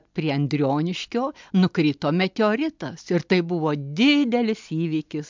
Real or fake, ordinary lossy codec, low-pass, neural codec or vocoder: real; MP3, 64 kbps; 7.2 kHz; none